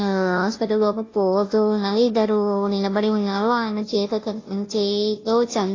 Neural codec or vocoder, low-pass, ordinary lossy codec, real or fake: codec, 16 kHz, 0.5 kbps, FunCodec, trained on Chinese and English, 25 frames a second; 7.2 kHz; AAC, 32 kbps; fake